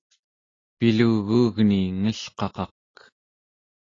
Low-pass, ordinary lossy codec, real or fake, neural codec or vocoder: 7.2 kHz; AAC, 32 kbps; real; none